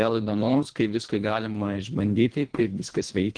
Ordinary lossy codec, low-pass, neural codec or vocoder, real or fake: Opus, 24 kbps; 9.9 kHz; codec, 24 kHz, 1.5 kbps, HILCodec; fake